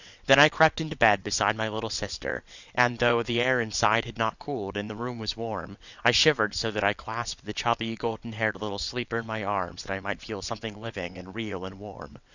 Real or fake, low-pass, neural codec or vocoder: fake; 7.2 kHz; vocoder, 22.05 kHz, 80 mel bands, WaveNeXt